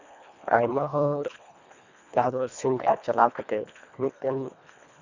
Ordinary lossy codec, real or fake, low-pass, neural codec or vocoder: none; fake; 7.2 kHz; codec, 24 kHz, 1.5 kbps, HILCodec